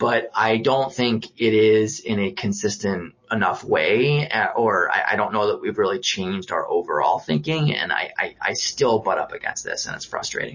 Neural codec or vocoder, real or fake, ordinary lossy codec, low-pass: none; real; MP3, 32 kbps; 7.2 kHz